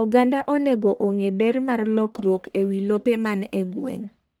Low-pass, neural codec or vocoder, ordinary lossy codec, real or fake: none; codec, 44.1 kHz, 1.7 kbps, Pupu-Codec; none; fake